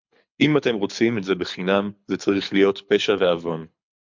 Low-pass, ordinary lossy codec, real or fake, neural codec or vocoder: 7.2 kHz; MP3, 64 kbps; fake; codec, 24 kHz, 6 kbps, HILCodec